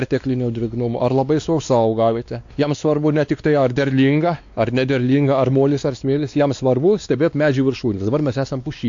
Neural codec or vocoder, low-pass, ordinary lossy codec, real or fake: codec, 16 kHz, 2 kbps, X-Codec, WavLM features, trained on Multilingual LibriSpeech; 7.2 kHz; AAC, 64 kbps; fake